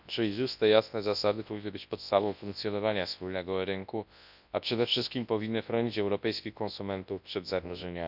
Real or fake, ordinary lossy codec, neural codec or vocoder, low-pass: fake; none; codec, 24 kHz, 0.9 kbps, WavTokenizer, large speech release; 5.4 kHz